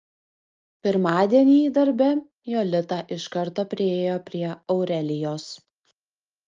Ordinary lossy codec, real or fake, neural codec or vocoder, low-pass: Opus, 24 kbps; real; none; 7.2 kHz